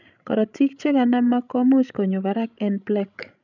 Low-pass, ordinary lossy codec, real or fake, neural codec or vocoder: 7.2 kHz; none; fake; codec, 16 kHz, 16 kbps, FreqCodec, larger model